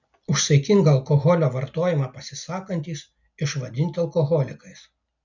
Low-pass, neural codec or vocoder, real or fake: 7.2 kHz; none; real